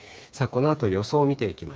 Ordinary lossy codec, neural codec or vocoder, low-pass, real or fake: none; codec, 16 kHz, 4 kbps, FreqCodec, smaller model; none; fake